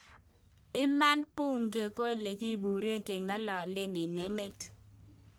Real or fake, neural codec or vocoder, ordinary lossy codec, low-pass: fake; codec, 44.1 kHz, 1.7 kbps, Pupu-Codec; none; none